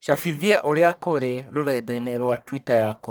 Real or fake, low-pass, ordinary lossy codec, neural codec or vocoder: fake; none; none; codec, 44.1 kHz, 1.7 kbps, Pupu-Codec